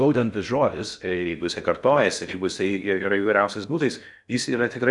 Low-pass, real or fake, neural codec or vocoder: 10.8 kHz; fake; codec, 16 kHz in and 24 kHz out, 0.6 kbps, FocalCodec, streaming, 4096 codes